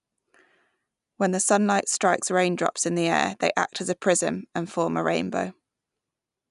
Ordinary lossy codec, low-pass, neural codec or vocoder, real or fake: none; 10.8 kHz; none; real